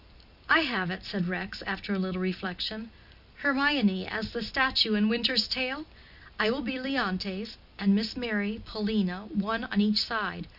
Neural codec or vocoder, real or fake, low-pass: none; real; 5.4 kHz